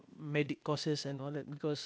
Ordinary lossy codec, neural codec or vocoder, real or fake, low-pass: none; codec, 16 kHz, 0.8 kbps, ZipCodec; fake; none